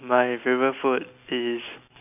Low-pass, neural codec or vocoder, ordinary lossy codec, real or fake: 3.6 kHz; none; none; real